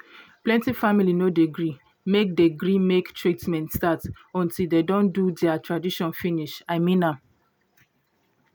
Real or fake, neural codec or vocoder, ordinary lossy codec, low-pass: real; none; none; none